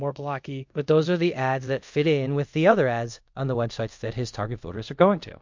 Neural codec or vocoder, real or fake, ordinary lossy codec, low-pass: codec, 24 kHz, 0.5 kbps, DualCodec; fake; MP3, 48 kbps; 7.2 kHz